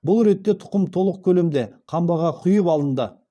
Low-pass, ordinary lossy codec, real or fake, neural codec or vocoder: none; none; fake; vocoder, 22.05 kHz, 80 mel bands, Vocos